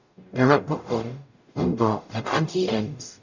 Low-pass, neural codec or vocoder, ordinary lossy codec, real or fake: 7.2 kHz; codec, 44.1 kHz, 0.9 kbps, DAC; none; fake